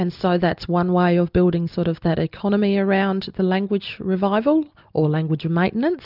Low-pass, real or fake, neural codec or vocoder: 5.4 kHz; real; none